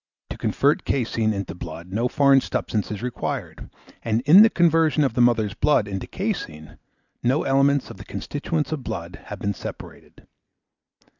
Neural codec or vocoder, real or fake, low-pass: none; real; 7.2 kHz